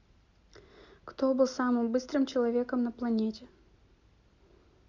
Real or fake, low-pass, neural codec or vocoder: real; 7.2 kHz; none